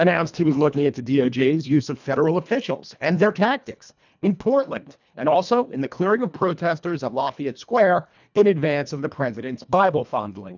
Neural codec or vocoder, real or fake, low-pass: codec, 24 kHz, 1.5 kbps, HILCodec; fake; 7.2 kHz